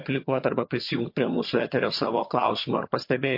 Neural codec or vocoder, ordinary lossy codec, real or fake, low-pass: vocoder, 22.05 kHz, 80 mel bands, HiFi-GAN; MP3, 32 kbps; fake; 5.4 kHz